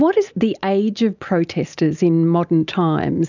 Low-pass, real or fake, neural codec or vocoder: 7.2 kHz; real; none